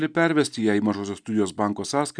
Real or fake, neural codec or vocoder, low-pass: real; none; 9.9 kHz